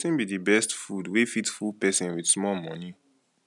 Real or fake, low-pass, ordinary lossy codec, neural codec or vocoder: real; 10.8 kHz; none; none